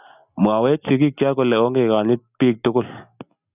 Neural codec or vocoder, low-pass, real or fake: none; 3.6 kHz; real